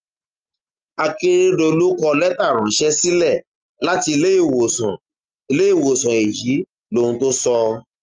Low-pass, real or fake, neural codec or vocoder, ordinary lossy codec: 7.2 kHz; real; none; Opus, 24 kbps